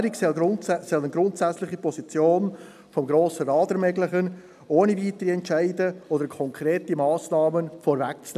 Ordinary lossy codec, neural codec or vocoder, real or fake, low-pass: none; none; real; 14.4 kHz